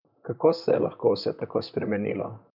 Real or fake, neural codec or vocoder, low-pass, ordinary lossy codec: fake; vocoder, 44.1 kHz, 128 mel bands, Pupu-Vocoder; 5.4 kHz; none